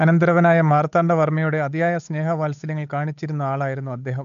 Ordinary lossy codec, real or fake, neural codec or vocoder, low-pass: AAC, 96 kbps; fake; codec, 16 kHz, 8 kbps, FunCodec, trained on Chinese and English, 25 frames a second; 7.2 kHz